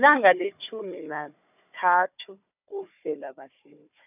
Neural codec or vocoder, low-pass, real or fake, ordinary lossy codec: codec, 16 kHz, 4 kbps, FunCodec, trained on Chinese and English, 50 frames a second; 3.6 kHz; fake; none